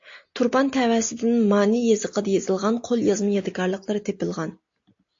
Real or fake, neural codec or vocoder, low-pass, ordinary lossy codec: real; none; 7.2 kHz; AAC, 48 kbps